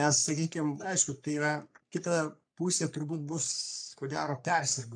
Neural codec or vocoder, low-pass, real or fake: codec, 44.1 kHz, 3.4 kbps, Pupu-Codec; 9.9 kHz; fake